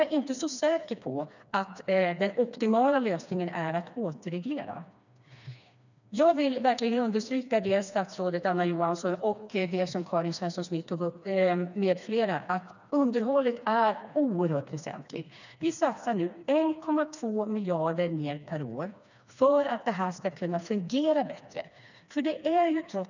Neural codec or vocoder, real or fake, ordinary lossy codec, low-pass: codec, 16 kHz, 2 kbps, FreqCodec, smaller model; fake; none; 7.2 kHz